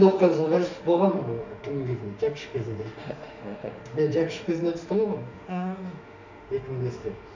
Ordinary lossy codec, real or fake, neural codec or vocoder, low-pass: none; fake; autoencoder, 48 kHz, 32 numbers a frame, DAC-VAE, trained on Japanese speech; 7.2 kHz